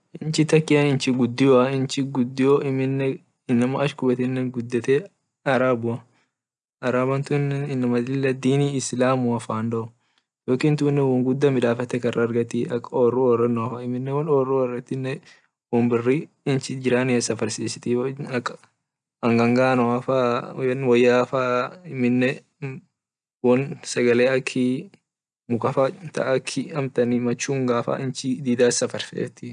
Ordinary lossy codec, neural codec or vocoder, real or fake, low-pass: none; none; real; 9.9 kHz